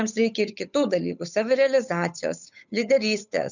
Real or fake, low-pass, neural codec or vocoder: fake; 7.2 kHz; codec, 16 kHz, 8 kbps, FunCodec, trained on Chinese and English, 25 frames a second